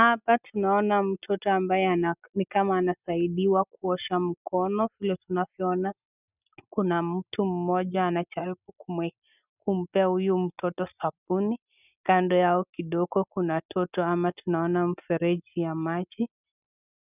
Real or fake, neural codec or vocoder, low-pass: real; none; 3.6 kHz